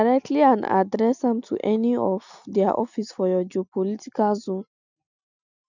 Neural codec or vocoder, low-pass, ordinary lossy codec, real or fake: none; 7.2 kHz; none; real